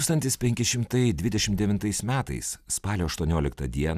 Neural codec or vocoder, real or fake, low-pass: none; real; 14.4 kHz